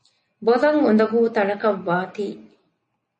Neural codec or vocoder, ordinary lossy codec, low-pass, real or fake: none; MP3, 32 kbps; 9.9 kHz; real